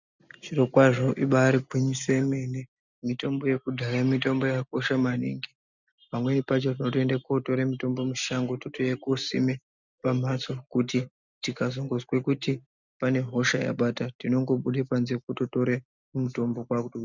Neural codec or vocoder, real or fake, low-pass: none; real; 7.2 kHz